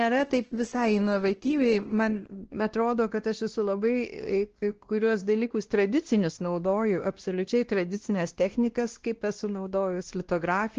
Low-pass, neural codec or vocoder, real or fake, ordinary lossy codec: 7.2 kHz; codec, 16 kHz, 1 kbps, X-Codec, WavLM features, trained on Multilingual LibriSpeech; fake; Opus, 16 kbps